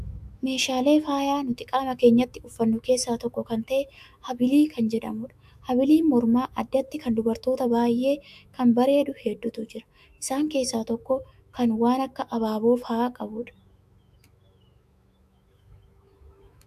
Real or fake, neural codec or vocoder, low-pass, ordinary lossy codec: fake; autoencoder, 48 kHz, 128 numbers a frame, DAC-VAE, trained on Japanese speech; 14.4 kHz; AAC, 96 kbps